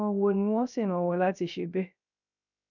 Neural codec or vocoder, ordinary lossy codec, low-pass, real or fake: codec, 16 kHz, 0.3 kbps, FocalCodec; none; 7.2 kHz; fake